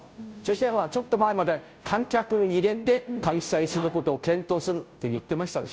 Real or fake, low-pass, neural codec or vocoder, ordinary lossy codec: fake; none; codec, 16 kHz, 0.5 kbps, FunCodec, trained on Chinese and English, 25 frames a second; none